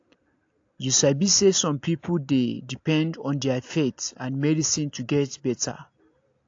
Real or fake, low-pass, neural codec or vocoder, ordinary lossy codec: real; 7.2 kHz; none; AAC, 48 kbps